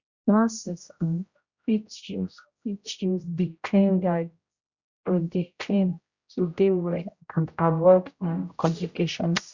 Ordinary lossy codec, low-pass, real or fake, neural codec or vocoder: Opus, 64 kbps; 7.2 kHz; fake; codec, 16 kHz, 0.5 kbps, X-Codec, HuBERT features, trained on general audio